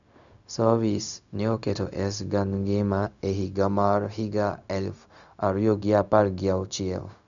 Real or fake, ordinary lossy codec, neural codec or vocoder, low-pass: fake; none; codec, 16 kHz, 0.4 kbps, LongCat-Audio-Codec; 7.2 kHz